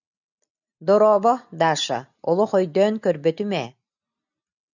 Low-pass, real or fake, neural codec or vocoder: 7.2 kHz; real; none